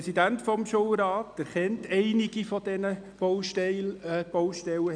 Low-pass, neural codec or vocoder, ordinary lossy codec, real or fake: 9.9 kHz; none; none; real